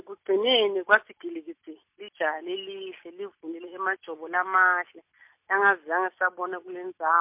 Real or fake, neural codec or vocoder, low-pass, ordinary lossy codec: real; none; 3.6 kHz; MP3, 32 kbps